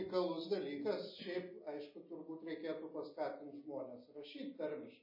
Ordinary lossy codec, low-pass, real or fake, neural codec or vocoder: MP3, 24 kbps; 5.4 kHz; real; none